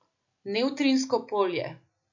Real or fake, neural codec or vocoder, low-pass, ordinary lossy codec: real; none; 7.2 kHz; none